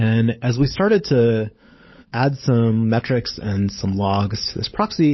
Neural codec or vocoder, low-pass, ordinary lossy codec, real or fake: codec, 16 kHz, 16 kbps, FunCodec, trained on LibriTTS, 50 frames a second; 7.2 kHz; MP3, 24 kbps; fake